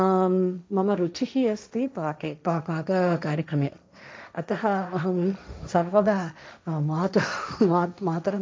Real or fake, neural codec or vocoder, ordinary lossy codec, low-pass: fake; codec, 16 kHz, 1.1 kbps, Voila-Tokenizer; none; none